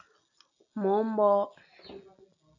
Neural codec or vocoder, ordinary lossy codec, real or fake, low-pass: none; AAC, 48 kbps; real; 7.2 kHz